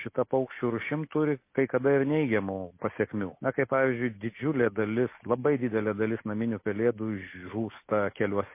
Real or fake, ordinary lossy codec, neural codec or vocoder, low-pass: real; MP3, 24 kbps; none; 3.6 kHz